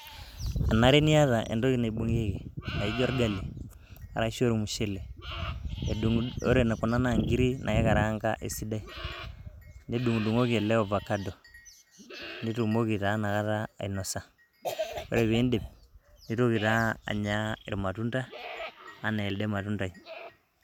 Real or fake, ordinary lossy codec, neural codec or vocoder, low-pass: real; none; none; 19.8 kHz